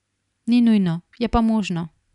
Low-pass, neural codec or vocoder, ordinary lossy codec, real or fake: 10.8 kHz; none; none; real